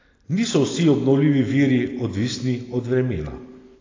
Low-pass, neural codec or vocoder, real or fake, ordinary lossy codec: 7.2 kHz; none; real; AAC, 32 kbps